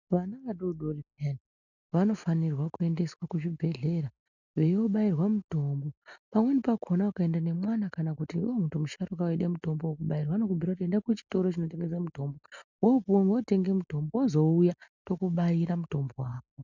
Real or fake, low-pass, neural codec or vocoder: real; 7.2 kHz; none